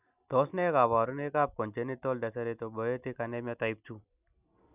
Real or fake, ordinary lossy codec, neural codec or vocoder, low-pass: real; none; none; 3.6 kHz